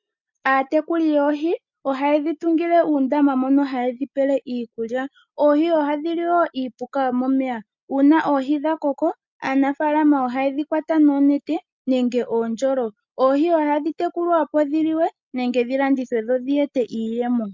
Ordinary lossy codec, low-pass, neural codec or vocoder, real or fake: MP3, 64 kbps; 7.2 kHz; none; real